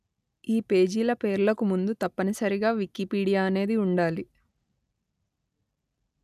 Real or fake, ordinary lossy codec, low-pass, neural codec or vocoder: real; none; 14.4 kHz; none